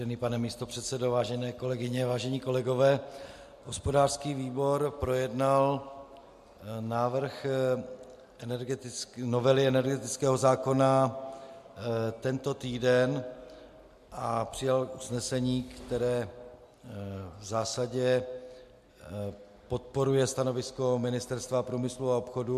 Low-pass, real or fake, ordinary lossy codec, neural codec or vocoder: 14.4 kHz; real; MP3, 64 kbps; none